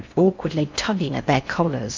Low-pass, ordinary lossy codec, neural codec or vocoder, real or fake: 7.2 kHz; MP3, 64 kbps; codec, 16 kHz in and 24 kHz out, 0.6 kbps, FocalCodec, streaming, 4096 codes; fake